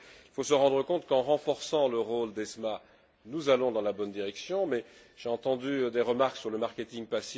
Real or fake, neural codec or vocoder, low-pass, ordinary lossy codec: real; none; none; none